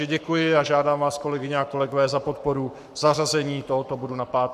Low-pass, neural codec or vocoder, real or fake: 14.4 kHz; codec, 44.1 kHz, 7.8 kbps, Pupu-Codec; fake